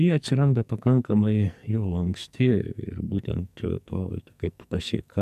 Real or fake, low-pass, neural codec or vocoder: fake; 14.4 kHz; codec, 44.1 kHz, 2.6 kbps, SNAC